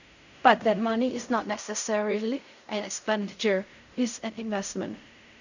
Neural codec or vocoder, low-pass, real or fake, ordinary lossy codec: codec, 16 kHz in and 24 kHz out, 0.4 kbps, LongCat-Audio-Codec, fine tuned four codebook decoder; 7.2 kHz; fake; none